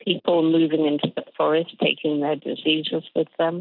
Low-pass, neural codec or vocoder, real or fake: 5.4 kHz; none; real